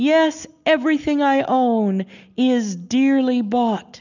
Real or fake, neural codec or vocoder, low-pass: real; none; 7.2 kHz